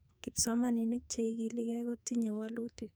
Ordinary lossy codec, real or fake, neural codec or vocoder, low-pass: none; fake; codec, 44.1 kHz, 2.6 kbps, SNAC; none